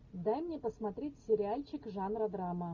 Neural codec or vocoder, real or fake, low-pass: none; real; 7.2 kHz